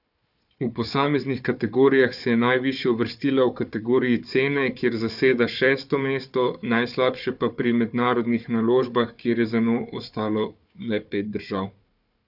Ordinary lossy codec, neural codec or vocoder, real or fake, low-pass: none; codec, 16 kHz, 4 kbps, FunCodec, trained on Chinese and English, 50 frames a second; fake; 5.4 kHz